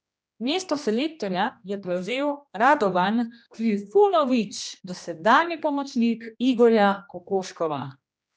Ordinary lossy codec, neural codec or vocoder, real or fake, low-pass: none; codec, 16 kHz, 1 kbps, X-Codec, HuBERT features, trained on general audio; fake; none